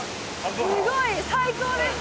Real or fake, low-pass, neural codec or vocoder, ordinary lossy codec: real; none; none; none